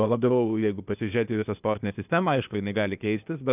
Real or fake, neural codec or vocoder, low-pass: fake; codec, 16 kHz, 0.8 kbps, ZipCodec; 3.6 kHz